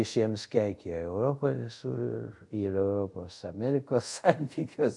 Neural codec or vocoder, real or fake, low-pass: codec, 24 kHz, 0.5 kbps, DualCodec; fake; 10.8 kHz